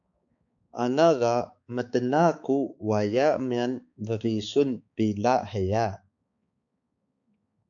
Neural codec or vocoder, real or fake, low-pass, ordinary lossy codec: codec, 16 kHz, 4 kbps, X-Codec, HuBERT features, trained on balanced general audio; fake; 7.2 kHz; AAC, 64 kbps